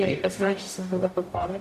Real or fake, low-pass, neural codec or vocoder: fake; 14.4 kHz; codec, 44.1 kHz, 0.9 kbps, DAC